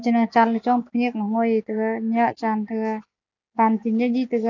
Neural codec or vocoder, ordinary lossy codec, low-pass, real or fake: none; none; 7.2 kHz; real